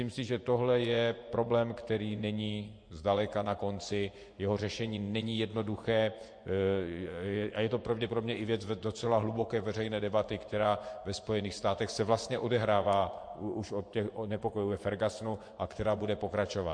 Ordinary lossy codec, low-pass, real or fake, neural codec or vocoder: MP3, 48 kbps; 9.9 kHz; fake; vocoder, 24 kHz, 100 mel bands, Vocos